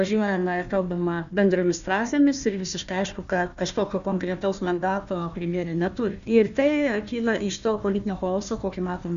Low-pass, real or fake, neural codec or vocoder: 7.2 kHz; fake; codec, 16 kHz, 1 kbps, FunCodec, trained on Chinese and English, 50 frames a second